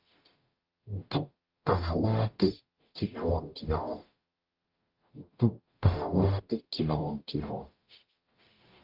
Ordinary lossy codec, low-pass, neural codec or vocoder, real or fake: Opus, 24 kbps; 5.4 kHz; codec, 44.1 kHz, 0.9 kbps, DAC; fake